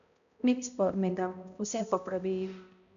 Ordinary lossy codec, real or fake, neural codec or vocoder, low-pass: none; fake; codec, 16 kHz, 0.5 kbps, X-Codec, HuBERT features, trained on balanced general audio; 7.2 kHz